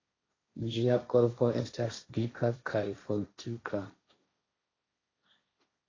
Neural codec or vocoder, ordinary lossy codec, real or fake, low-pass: codec, 16 kHz, 1.1 kbps, Voila-Tokenizer; AAC, 32 kbps; fake; 7.2 kHz